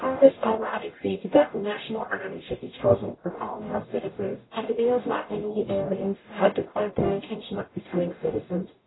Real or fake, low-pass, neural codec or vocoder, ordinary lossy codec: fake; 7.2 kHz; codec, 44.1 kHz, 0.9 kbps, DAC; AAC, 16 kbps